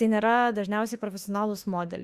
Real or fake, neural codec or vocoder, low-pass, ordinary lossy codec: fake; autoencoder, 48 kHz, 32 numbers a frame, DAC-VAE, trained on Japanese speech; 14.4 kHz; Opus, 64 kbps